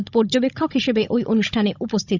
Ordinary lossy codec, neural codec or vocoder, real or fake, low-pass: none; codec, 16 kHz, 16 kbps, FunCodec, trained on Chinese and English, 50 frames a second; fake; 7.2 kHz